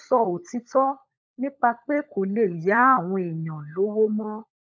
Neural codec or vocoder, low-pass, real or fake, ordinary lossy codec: codec, 16 kHz, 16 kbps, FunCodec, trained on LibriTTS, 50 frames a second; none; fake; none